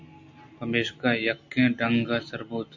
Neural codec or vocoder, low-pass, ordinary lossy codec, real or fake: none; 7.2 kHz; AAC, 48 kbps; real